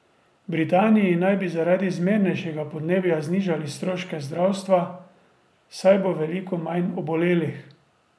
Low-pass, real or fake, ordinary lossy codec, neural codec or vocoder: none; real; none; none